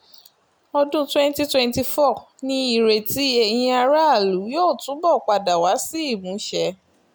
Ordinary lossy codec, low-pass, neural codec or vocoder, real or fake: none; none; none; real